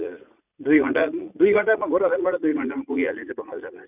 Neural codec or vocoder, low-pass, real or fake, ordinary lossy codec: vocoder, 44.1 kHz, 80 mel bands, Vocos; 3.6 kHz; fake; none